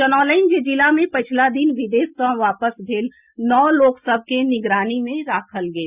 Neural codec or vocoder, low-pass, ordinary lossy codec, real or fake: none; 3.6 kHz; Opus, 64 kbps; real